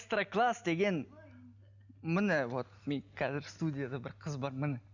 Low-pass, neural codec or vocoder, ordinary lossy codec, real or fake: 7.2 kHz; none; none; real